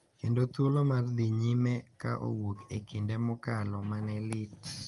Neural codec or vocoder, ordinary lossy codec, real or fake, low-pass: none; Opus, 32 kbps; real; 10.8 kHz